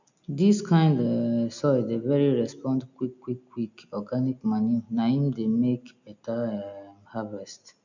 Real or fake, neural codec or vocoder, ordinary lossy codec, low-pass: real; none; none; 7.2 kHz